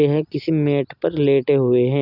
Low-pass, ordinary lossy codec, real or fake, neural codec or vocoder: 5.4 kHz; none; real; none